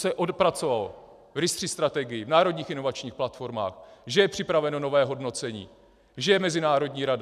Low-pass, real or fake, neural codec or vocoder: 14.4 kHz; real; none